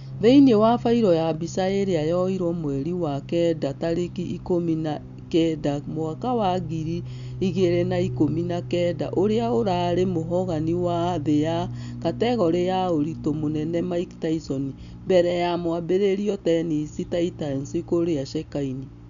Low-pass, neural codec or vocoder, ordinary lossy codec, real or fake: 7.2 kHz; none; none; real